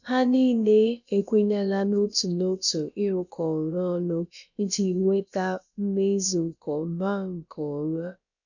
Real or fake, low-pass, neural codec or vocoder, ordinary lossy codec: fake; 7.2 kHz; codec, 16 kHz, about 1 kbps, DyCAST, with the encoder's durations; none